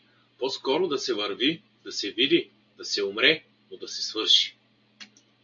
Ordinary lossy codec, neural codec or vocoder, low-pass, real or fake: AAC, 64 kbps; none; 7.2 kHz; real